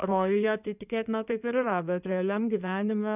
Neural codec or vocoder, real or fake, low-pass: codec, 16 kHz in and 24 kHz out, 2.2 kbps, FireRedTTS-2 codec; fake; 3.6 kHz